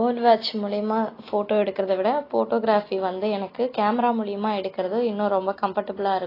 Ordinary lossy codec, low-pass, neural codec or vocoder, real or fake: AAC, 24 kbps; 5.4 kHz; none; real